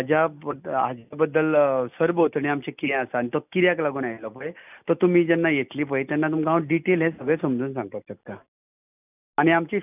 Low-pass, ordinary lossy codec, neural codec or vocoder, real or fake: 3.6 kHz; none; none; real